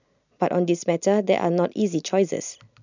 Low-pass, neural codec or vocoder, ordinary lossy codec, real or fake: 7.2 kHz; none; none; real